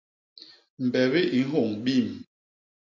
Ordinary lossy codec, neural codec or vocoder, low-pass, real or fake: MP3, 48 kbps; none; 7.2 kHz; real